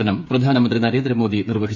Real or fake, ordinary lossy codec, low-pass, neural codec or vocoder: fake; AAC, 48 kbps; 7.2 kHz; codec, 16 kHz, 8 kbps, FreqCodec, smaller model